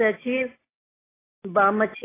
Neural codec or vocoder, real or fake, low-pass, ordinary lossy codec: none; real; 3.6 kHz; AAC, 16 kbps